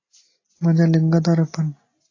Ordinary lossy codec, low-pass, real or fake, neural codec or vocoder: AAC, 32 kbps; 7.2 kHz; real; none